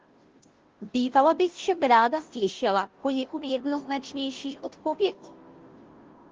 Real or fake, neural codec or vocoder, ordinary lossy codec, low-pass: fake; codec, 16 kHz, 0.5 kbps, FunCodec, trained on Chinese and English, 25 frames a second; Opus, 16 kbps; 7.2 kHz